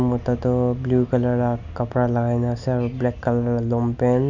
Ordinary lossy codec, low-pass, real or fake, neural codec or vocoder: none; 7.2 kHz; real; none